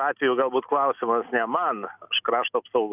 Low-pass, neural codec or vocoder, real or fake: 3.6 kHz; none; real